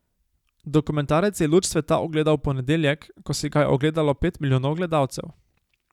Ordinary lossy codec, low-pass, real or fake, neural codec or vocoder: none; 19.8 kHz; real; none